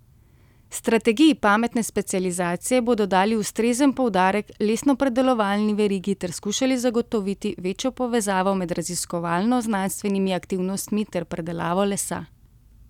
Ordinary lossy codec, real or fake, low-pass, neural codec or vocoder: none; real; 19.8 kHz; none